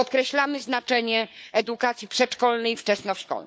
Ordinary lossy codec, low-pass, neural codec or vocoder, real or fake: none; none; codec, 16 kHz, 4 kbps, FunCodec, trained on Chinese and English, 50 frames a second; fake